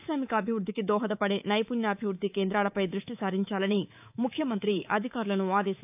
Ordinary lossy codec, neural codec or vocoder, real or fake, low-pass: AAC, 32 kbps; codec, 24 kHz, 3.1 kbps, DualCodec; fake; 3.6 kHz